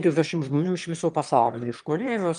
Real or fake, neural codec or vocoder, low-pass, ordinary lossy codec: fake; autoencoder, 22.05 kHz, a latent of 192 numbers a frame, VITS, trained on one speaker; 9.9 kHz; Opus, 32 kbps